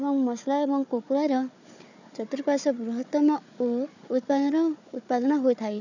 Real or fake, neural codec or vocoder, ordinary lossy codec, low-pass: fake; codec, 16 kHz, 4 kbps, FunCodec, trained on Chinese and English, 50 frames a second; none; 7.2 kHz